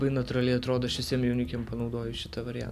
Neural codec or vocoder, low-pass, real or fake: none; 14.4 kHz; real